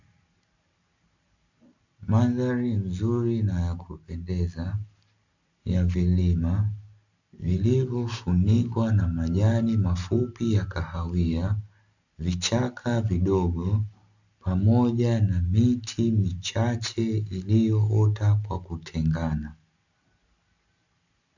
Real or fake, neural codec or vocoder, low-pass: real; none; 7.2 kHz